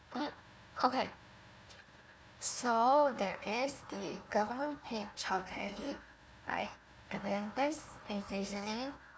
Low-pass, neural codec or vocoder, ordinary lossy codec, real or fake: none; codec, 16 kHz, 1 kbps, FunCodec, trained on Chinese and English, 50 frames a second; none; fake